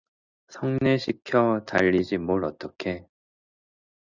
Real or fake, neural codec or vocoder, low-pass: real; none; 7.2 kHz